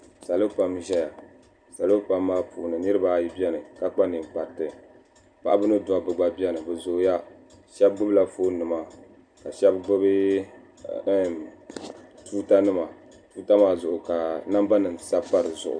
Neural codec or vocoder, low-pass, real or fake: none; 9.9 kHz; real